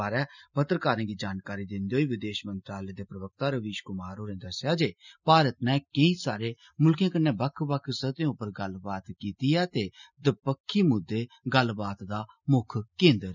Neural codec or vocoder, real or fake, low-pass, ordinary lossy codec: none; real; 7.2 kHz; none